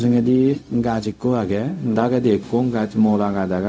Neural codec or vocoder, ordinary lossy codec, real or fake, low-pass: codec, 16 kHz, 0.4 kbps, LongCat-Audio-Codec; none; fake; none